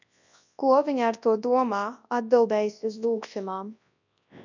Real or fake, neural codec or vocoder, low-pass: fake; codec, 24 kHz, 0.9 kbps, WavTokenizer, large speech release; 7.2 kHz